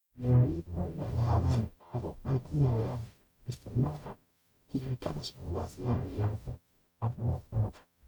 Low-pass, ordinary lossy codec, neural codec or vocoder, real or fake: 19.8 kHz; none; codec, 44.1 kHz, 0.9 kbps, DAC; fake